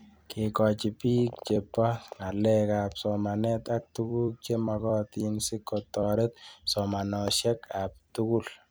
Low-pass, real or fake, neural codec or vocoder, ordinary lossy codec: none; fake; vocoder, 44.1 kHz, 128 mel bands every 256 samples, BigVGAN v2; none